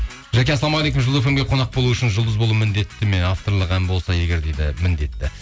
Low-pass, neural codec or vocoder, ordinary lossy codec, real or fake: none; none; none; real